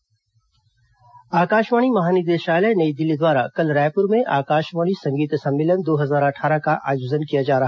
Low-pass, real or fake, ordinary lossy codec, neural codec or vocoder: none; real; none; none